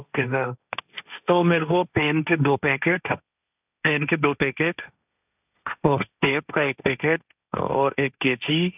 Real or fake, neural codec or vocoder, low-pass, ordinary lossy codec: fake; codec, 16 kHz, 1.1 kbps, Voila-Tokenizer; 3.6 kHz; none